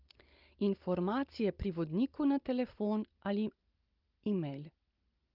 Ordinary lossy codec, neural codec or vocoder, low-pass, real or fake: Opus, 32 kbps; none; 5.4 kHz; real